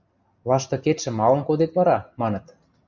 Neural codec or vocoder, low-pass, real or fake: none; 7.2 kHz; real